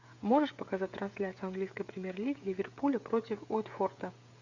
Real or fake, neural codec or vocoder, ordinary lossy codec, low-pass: fake; codec, 16 kHz, 16 kbps, FreqCodec, smaller model; AAC, 48 kbps; 7.2 kHz